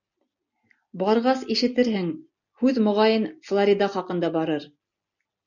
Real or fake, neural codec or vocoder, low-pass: real; none; 7.2 kHz